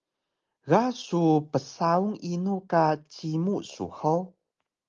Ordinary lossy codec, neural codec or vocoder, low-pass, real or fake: Opus, 32 kbps; none; 7.2 kHz; real